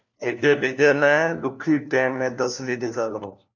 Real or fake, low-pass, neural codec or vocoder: fake; 7.2 kHz; codec, 16 kHz, 1 kbps, FunCodec, trained on LibriTTS, 50 frames a second